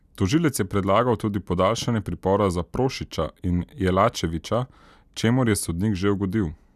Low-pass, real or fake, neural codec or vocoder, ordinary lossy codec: 14.4 kHz; real; none; none